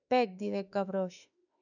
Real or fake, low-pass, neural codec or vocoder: fake; 7.2 kHz; autoencoder, 48 kHz, 32 numbers a frame, DAC-VAE, trained on Japanese speech